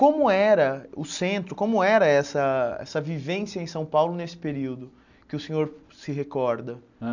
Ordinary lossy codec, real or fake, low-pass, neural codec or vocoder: none; real; 7.2 kHz; none